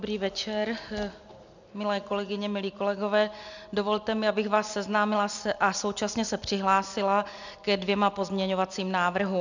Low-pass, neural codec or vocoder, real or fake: 7.2 kHz; none; real